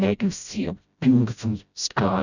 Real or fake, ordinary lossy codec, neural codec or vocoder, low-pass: fake; none; codec, 16 kHz, 0.5 kbps, FreqCodec, smaller model; 7.2 kHz